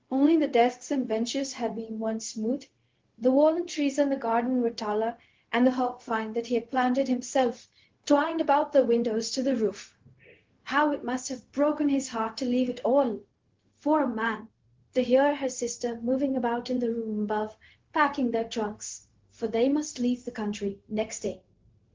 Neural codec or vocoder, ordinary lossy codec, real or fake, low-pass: codec, 16 kHz, 0.4 kbps, LongCat-Audio-Codec; Opus, 16 kbps; fake; 7.2 kHz